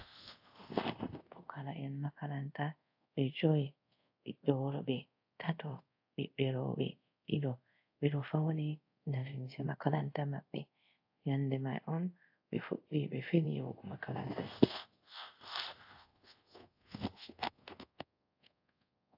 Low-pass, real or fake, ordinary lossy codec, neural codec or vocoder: 5.4 kHz; fake; AAC, 48 kbps; codec, 24 kHz, 0.5 kbps, DualCodec